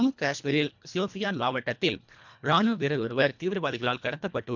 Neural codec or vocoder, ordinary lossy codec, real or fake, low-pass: codec, 24 kHz, 1.5 kbps, HILCodec; none; fake; 7.2 kHz